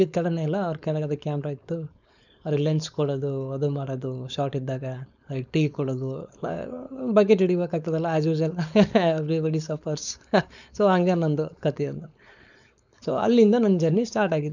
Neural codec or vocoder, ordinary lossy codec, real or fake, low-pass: codec, 16 kHz, 4.8 kbps, FACodec; none; fake; 7.2 kHz